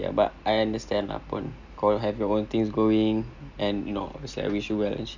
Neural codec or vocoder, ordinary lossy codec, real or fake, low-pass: none; none; real; 7.2 kHz